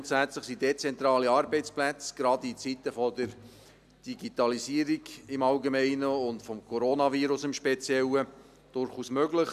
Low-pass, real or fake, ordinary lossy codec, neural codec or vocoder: 14.4 kHz; real; none; none